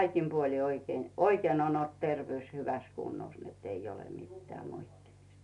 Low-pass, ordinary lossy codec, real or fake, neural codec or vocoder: 10.8 kHz; none; real; none